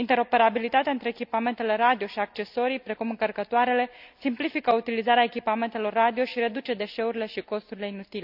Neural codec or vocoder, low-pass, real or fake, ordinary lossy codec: none; 5.4 kHz; real; none